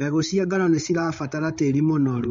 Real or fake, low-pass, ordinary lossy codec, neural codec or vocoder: fake; 7.2 kHz; MP3, 48 kbps; codec, 16 kHz, 8 kbps, FreqCodec, larger model